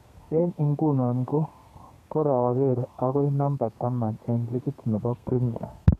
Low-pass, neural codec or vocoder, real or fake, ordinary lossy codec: 14.4 kHz; codec, 32 kHz, 1.9 kbps, SNAC; fake; none